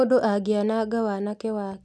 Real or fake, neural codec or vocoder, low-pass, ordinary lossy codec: real; none; none; none